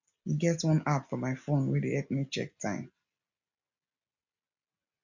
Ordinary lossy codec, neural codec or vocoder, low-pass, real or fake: none; none; 7.2 kHz; real